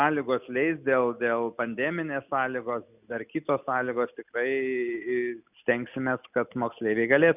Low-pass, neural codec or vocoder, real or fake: 3.6 kHz; none; real